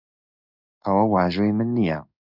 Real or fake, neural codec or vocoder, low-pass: fake; codec, 16 kHz in and 24 kHz out, 1 kbps, XY-Tokenizer; 5.4 kHz